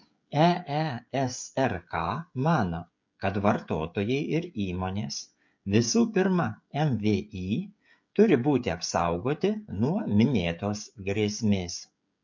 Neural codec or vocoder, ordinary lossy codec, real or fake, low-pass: codec, 16 kHz, 16 kbps, FreqCodec, smaller model; MP3, 48 kbps; fake; 7.2 kHz